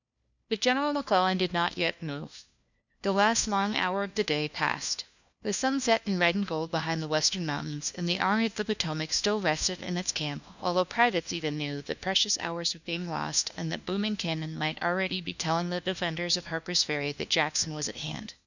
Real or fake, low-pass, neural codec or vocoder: fake; 7.2 kHz; codec, 16 kHz, 1 kbps, FunCodec, trained on LibriTTS, 50 frames a second